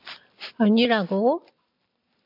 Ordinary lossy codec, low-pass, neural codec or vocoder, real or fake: MP3, 48 kbps; 5.4 kHz; none; real